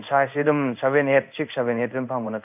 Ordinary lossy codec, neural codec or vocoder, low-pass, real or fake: none; codec, 16 kHz in and 24 kHz out, 1 kbps, XY-Tokenizer; 3.6 kHz; fake